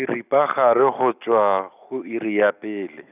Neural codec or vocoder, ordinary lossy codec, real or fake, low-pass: none; none; real; 3.6 kHz